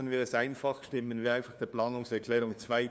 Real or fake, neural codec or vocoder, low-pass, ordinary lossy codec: fake; codec, 16 kHz, 2 kbps, FunCodec, trained on LibriTTS, 25 frames a second; none; none